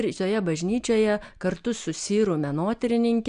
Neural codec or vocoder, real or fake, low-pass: none; real; 9.9 kHz